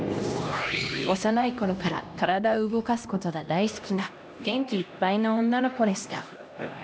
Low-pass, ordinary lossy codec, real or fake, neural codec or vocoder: none; none; fake; codec, 16 kHz, 1 kbps, X-Codec, HuBERT features, trained on LibriSpeech